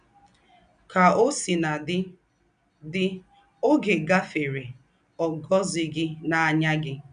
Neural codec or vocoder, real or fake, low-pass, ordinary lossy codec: none; real; 9.9 kHz; none